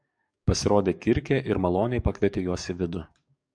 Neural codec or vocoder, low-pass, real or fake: codec, 44.1 kHz, 7.8 kbps, DAC; 9.9 kHz; fake